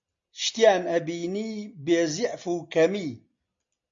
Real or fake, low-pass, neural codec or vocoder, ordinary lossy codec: real; 7.2 kHz; none; MP3, 48 kbps